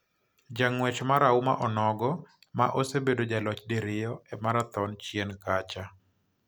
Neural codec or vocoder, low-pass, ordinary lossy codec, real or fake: none; none; none; real